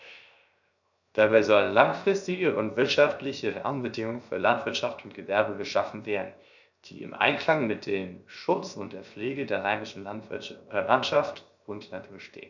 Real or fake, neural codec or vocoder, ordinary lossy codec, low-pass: fake; codec, 16 kHz, 0.7 kbps, FocalCodec; none; 7.2 kHz